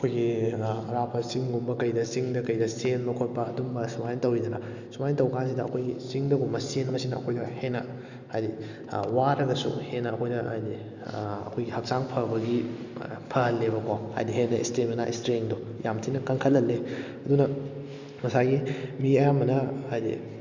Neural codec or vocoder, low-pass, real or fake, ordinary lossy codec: none; 7.2 kHz; real; Opus, 64 kbps